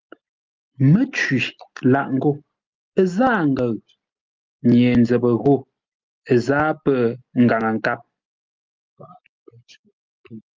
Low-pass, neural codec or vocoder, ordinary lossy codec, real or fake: 7.2 kHz; none; Opus, 32 kbps; real